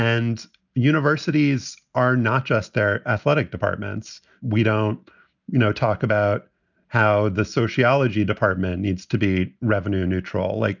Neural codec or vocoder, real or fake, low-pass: none; real; 7.2 kHz